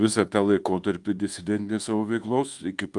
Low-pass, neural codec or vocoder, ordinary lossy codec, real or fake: 10.8 kHz; codec, 24 kHz, 1.2 kbps, DualCodec; Opus, 24 kbps; fake